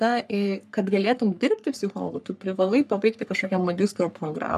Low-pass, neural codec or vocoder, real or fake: 14.4 kHz; codec, 44.1 kHz, 3.4 kbps, Pupu-Codec; fake